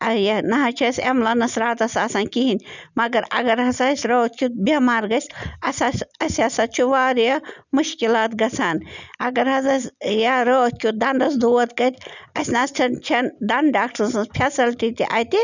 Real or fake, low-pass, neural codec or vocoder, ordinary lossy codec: real; 7.2 kHz; none; none